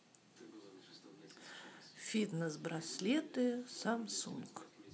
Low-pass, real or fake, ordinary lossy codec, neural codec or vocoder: none; real; none; none